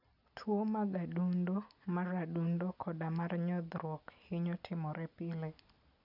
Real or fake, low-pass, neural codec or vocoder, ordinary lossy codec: real; 5.4 kHz; none; none